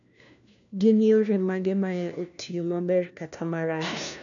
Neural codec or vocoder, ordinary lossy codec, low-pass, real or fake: codec, 16 kHz, 1 kbps, FunCodec, trained on LibriTTS, 50 frames a second; none; 7.2 kHz; fake